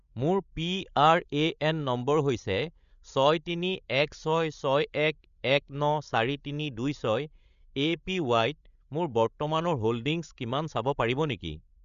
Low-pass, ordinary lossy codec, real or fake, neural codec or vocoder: 7.2 kHz; none; fake; codec, 16 kHz, 16 kbps, FreqCodec, larger model